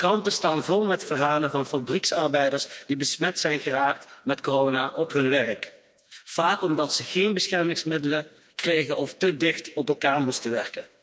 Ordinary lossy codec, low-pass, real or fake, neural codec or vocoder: none; none; fake; codec, 16 kHz, 2 kbps, FreqCodec, smaller model